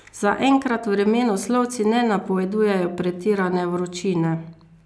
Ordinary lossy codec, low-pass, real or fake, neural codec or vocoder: none; none; real; none